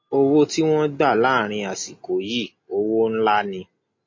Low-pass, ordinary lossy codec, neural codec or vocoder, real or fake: 7.2 kHz; MP3, 32 kbps; none; real